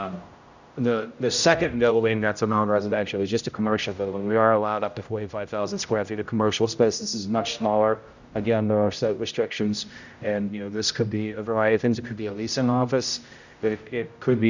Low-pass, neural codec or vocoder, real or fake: 7.2 kHz; codec, 16 kHz, 0.5 kbps, X-Codec, HuBERT features, trained on general audio; fake